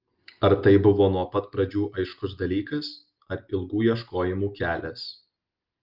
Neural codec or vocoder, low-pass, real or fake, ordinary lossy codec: none; 5.4 kHz; real; Opus, 24 kbps